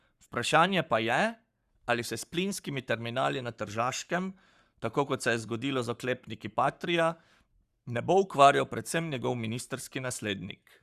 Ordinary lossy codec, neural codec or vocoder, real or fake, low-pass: Opus, 64 kbps; codec, 44.1 kHz, 7.8 kbps, Pupu-Codec; fake; 14.4 kHz